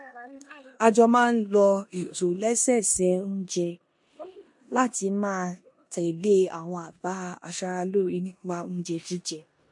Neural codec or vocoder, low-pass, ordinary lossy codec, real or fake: codec, 16 kHz in and 24 kHz out, 0.9 kbps, LongCat-Audio-Codec, four codebook decoder; 10.8 kHz; MP3, 48 kbps; fake